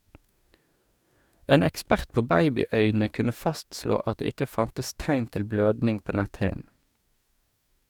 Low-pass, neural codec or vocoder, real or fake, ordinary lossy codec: 19.8 kHz; codec, 44.1 kHz, 2.6 kbps, DAC; fake; none